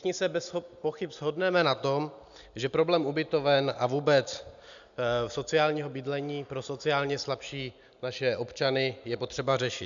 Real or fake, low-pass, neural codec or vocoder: real; 7.2 kHz; none